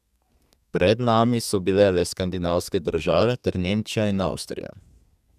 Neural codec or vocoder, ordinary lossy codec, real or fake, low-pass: codec, 32 kHz, 1.9 kbps, SNAC; none; fake; 14.4 kHz